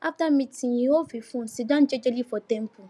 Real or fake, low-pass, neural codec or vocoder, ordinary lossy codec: real; none; none; none